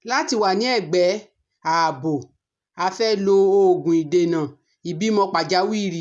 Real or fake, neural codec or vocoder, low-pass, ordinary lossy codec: real; none; 10.8 kHz; none